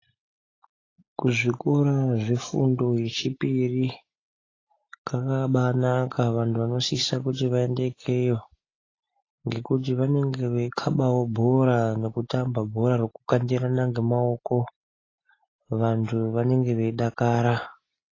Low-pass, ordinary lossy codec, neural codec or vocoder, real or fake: 7.2 kHz; AAC, 32 kbps; none; real